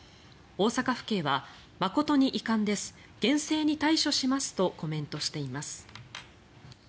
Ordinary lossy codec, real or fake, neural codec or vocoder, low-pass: none; real; none; none